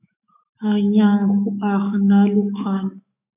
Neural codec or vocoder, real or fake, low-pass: autoencoder, 48 kHz, 128 numbers a frame, DAC-VAE, trained on Japanese speech; fake; 3.6 kHz